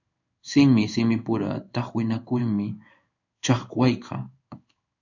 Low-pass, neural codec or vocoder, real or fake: 7.2 kHz; codec, 16 kHz in and 24 kHz out, 1 kbps, XY-Tokenizer; fake